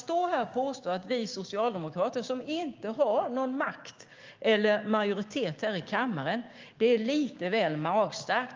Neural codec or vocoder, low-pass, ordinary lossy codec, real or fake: codec, 16 kHz, 6 kbps, DAC; 7.2 kHz; Opus, 32 kbps; fake